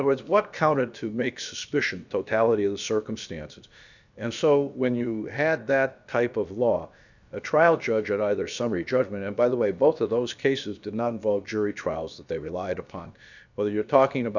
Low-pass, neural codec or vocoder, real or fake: 7.2 kHz; codec, 16 kHz, about 1 kbps, DyCAST, with the encoder's durations; fake